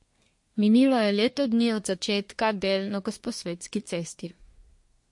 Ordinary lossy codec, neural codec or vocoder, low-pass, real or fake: MP3, 48 kbps; codec, 24 kHz, 1 kbps, SNAC; 10.8 kHz; fake